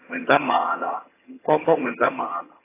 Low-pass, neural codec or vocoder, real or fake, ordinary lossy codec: 3.6 kHz; vocoder, 22.05 kHz, 80 mel bands, HiFi-GAN; fake; AAC, 16 kbps